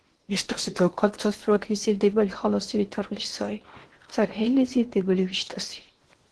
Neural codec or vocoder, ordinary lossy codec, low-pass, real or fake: codec, 16 kHz in and 24 kHz out, 0.8 kbps, FocalCodec, streaming, 65536 codes; Opus, 16 kbps; 10.8 kHz; fake